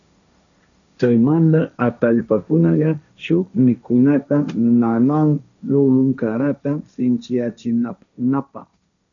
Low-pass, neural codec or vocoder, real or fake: 7.2 kHz; codec, 16 kHz, 1.1 kbps, Voila-Tokenizer; fake